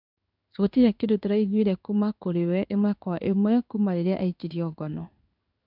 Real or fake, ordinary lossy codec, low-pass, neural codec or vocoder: fake; none; 5.4 kHz; codec, 16 kHz in and 24 kHz out, 1 kbps, XY-Tokenizer